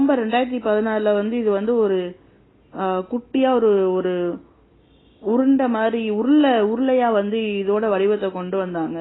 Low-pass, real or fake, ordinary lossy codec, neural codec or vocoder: 7.2 kHz; real; AAC, 16 kbps; none